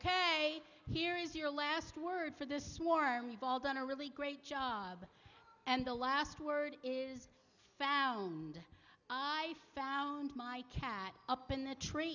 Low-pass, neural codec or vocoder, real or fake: 7.2 kHz; none; real